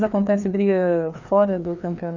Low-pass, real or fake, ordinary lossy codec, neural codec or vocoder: 7.2 kHz; fake; none; codec, 16 kHz, 2 kbps, FreqCodec, larger model